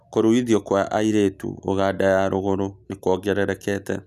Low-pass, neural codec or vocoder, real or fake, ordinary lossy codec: 14.4 kHz; none; real; none